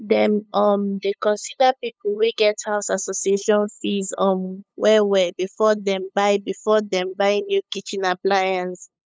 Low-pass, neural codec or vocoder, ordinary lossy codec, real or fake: none; codec, 16 kHz, 8 kbps, FunCodec, trained on LibriTTS, 25 frames a second; none; fake